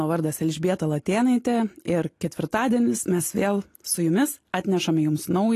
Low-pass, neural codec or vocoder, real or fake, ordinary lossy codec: 14.4 kHz; none; real; AAC, 48 kbps